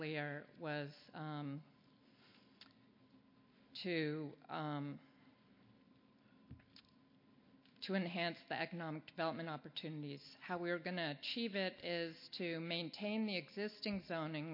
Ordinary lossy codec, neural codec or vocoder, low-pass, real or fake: MP3, 32 kbps; none; 5.4 kHz; real